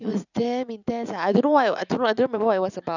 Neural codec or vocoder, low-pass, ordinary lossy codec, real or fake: vocoder, 44.1 kHz, 80 mel bands, Vocos; 7.2 kHz; none; fake